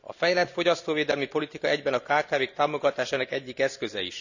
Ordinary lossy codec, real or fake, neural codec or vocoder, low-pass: none; real; none; 7.2 kHz